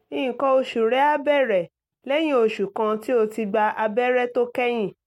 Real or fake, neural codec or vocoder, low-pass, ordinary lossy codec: real; none; 19.8 kHz; MP3, 64 kbps